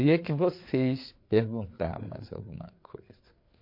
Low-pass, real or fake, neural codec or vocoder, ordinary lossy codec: 5.4 kHz; fake; codec, 16 kHz, 4 kbps, FreqCodec, larger model; MP3, 32 kbps